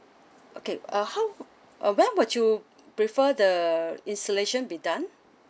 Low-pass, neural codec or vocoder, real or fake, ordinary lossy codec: none; none; real; none